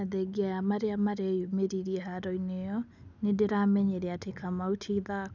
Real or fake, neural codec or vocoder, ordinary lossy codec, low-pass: real; none; none; 7.2 kHz